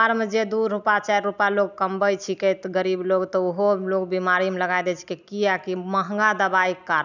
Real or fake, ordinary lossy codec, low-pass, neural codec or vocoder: real; none; 7.2 kHz; none